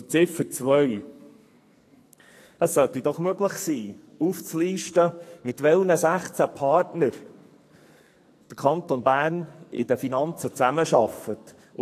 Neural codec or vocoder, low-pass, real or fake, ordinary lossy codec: codec, 44.1 kHz, 2.6 kbps, SNAC; 14.4 kHz; fake; AAC, 64 kbps